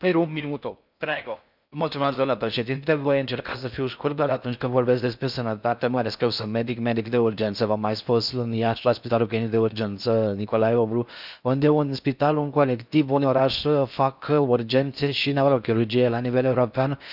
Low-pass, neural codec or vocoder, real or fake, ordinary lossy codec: 5.4 kHz; codec, 16 kHz in and 24 kHz out, 0.6 kbps, FocalCodec, streaming, 4096 codes; fake; none